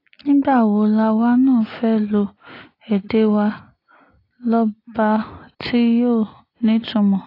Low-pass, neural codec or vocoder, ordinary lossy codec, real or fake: 5.4 kHz; none; AAC, 24 kbps; real